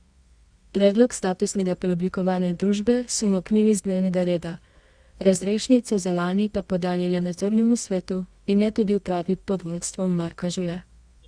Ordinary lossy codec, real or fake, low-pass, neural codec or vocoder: Opus, 64 kbps; fake; 9.9 kHz; codec, 24 kHz, 0.9 kbps, WavTokenizer, medium music audio release